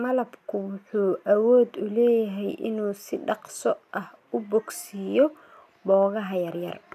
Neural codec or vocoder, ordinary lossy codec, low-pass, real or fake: none; AAC, 96 kbps; 14.4 kHz; real